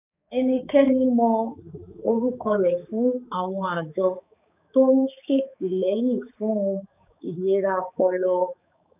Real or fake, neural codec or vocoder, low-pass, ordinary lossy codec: fake; codec, 16 kHz, 4 kbps, X-Codec, HuBERT features, trained on balanced general audio; 3.6 kHz; none